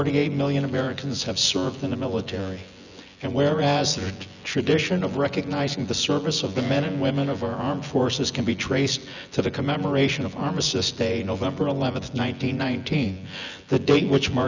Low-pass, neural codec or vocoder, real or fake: 7.2 kHz; vocoder, 24 kHz, 100 mel bands, Vocos; fake